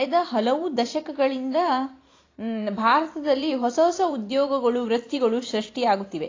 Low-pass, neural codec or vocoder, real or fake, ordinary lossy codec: 7.2 kHz; vocoder, 44.1 kHz, 128 mel bands every 512 samples, BigVGAN v2; fake; AAC, 32 kbps